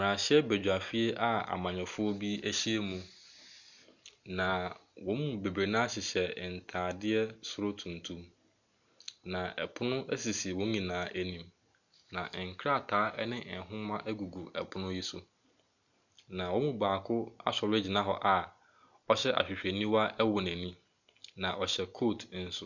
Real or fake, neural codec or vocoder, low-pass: real; none; 7.2 kHz